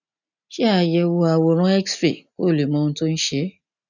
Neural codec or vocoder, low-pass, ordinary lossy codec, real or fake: none; 7.2 kHz; none; real